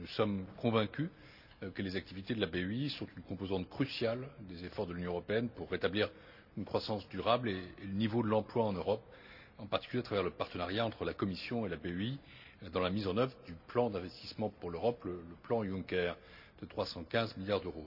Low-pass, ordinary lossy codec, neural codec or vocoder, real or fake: 5.4 kHz; none; none; real